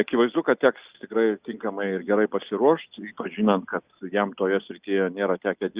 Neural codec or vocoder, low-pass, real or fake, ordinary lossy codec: none; 3.6 kHz; real; Opus, 64 kbps